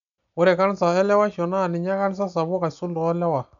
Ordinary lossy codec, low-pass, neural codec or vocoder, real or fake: MP3, 96 kbps; 7.2 kHz; none; real